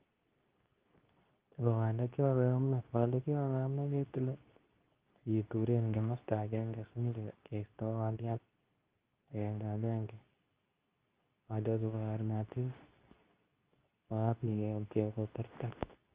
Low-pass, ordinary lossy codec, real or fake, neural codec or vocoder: 3.6 kHz; Opus, 32 kbps; fake; codec, 24 kHz, 0.9 kbps, WavTokenizer, medium speech release version 2